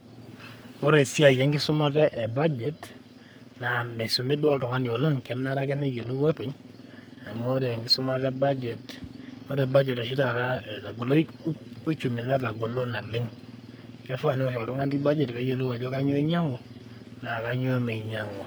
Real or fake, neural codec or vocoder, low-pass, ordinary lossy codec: fake; codec, 44.1 kHz, 3.4 kbps, Pupu-Codec; none; none